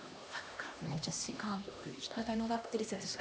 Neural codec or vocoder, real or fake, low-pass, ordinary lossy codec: codec, 16 kHz, 1 kbps, X-Codec, HuBERT features, trained on LibriSpeech; fake; none; none